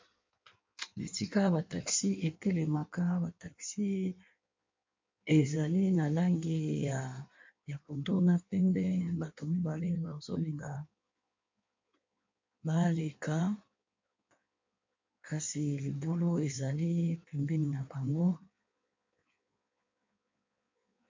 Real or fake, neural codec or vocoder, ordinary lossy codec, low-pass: fake; codec, 16 kHz in and 24 kHz out, 1.1 kbps, FireRedTTS-2 codec; MP3, 48 kbps; 7.2 kHz